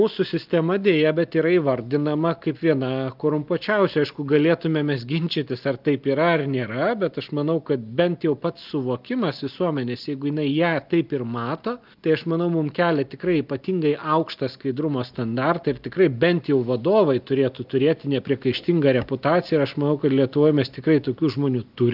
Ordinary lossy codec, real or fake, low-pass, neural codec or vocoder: Opus, 24 kbps; real; 5.4 kHz; none